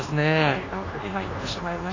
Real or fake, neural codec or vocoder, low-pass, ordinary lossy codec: fake; codec, 24 kHz, 1.2 kbps, DualCodec; 7.2 kHz; AAC, 32 kbps